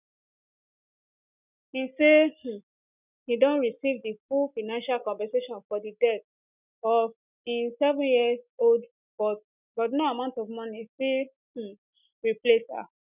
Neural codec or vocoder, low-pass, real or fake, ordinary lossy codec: none; 3.6 kHz; real; none